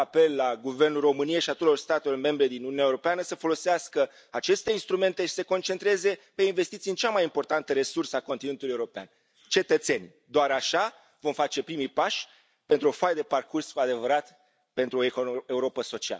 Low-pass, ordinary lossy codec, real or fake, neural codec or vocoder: none; none; real; none